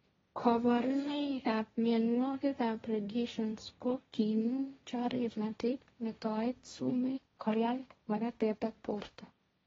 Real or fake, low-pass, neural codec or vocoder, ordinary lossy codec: fake; 7.2 kHz; codec, 16 kHz, 1.1 kbps, Voila-Tokenizer; AAC, 24 kbps